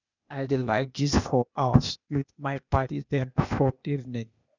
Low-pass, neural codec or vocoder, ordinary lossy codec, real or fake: 7.2 kHz; codec, 16 kHz, 0.8 kbps, ZipCodec; none; fake